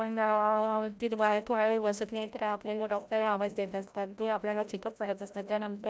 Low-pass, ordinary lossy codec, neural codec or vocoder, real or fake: none; none; codec, 16 kHz, 0.5 kbps, FreqCodec, larger model; fake